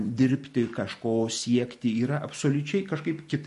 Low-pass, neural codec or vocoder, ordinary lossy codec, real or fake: 14.4 kHz; none; MP3, 48 kbps; real